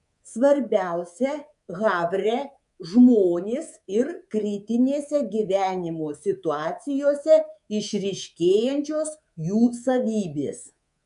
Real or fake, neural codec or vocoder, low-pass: fake; codec, 24 kHz, 3.1 kbps, DualCodec; 10.8 kHz